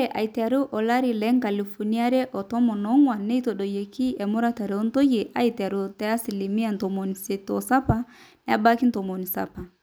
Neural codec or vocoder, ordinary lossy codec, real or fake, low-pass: none; none; real; none